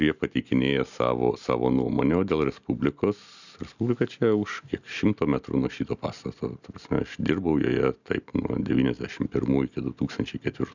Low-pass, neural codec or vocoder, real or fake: 7.2 kHz; none; real